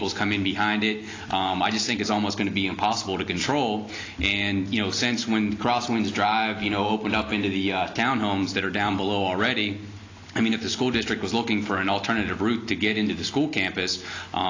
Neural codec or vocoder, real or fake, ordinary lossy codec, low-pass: none; real; AAC, 32 kbps; 7.2 kHz